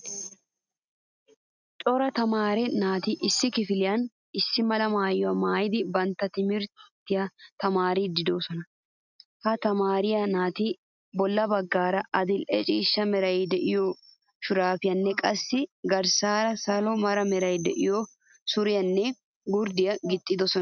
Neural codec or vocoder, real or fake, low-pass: none; real; 7.2 kHz